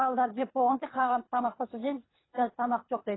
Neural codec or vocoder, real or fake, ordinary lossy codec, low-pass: codec, 24 kHz, 3 kbps, HILCodec; fake; AAC, 16 kbps; 7.2 kHz